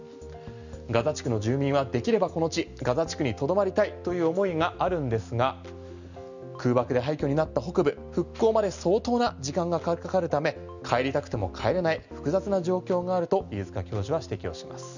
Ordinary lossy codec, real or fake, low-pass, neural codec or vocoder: none; real; 7.2 kHz; none